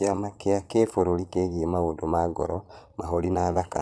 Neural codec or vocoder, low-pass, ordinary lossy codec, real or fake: vocoder, 22.05 kHz, 80 mel bands, Vocos; none; none; fake